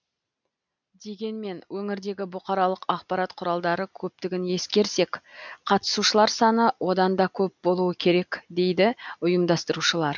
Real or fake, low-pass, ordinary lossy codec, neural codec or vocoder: real; 7.2 kHz; none; none